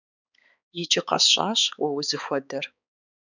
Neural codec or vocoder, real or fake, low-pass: codec, 16 kHz, 4 kbps, X-Codec, HuBERT features, trained on balanced general audio; fake; 7.2 kHz